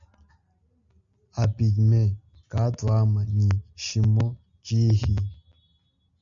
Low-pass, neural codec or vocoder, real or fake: 7.2 kHz; none; real